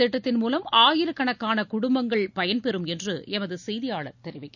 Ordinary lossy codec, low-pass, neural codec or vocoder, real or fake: none; 7.2 kHz; none; real